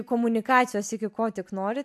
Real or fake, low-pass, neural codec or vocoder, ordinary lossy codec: real; 14.4 kHz; none; AAC, 96 kbps